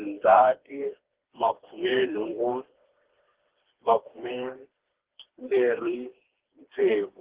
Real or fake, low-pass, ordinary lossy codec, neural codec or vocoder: fake; 3.6 kHz; Opus, 16 kbps; codec, 16 kHz, 2 kbps, FreqCodec, smaller model